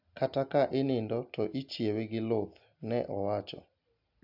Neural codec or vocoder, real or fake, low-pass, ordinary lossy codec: none; real; 5.4 kHz; AAC, 48 kbps